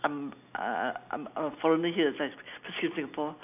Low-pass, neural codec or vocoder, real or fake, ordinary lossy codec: 3.6 kHz; none; real; none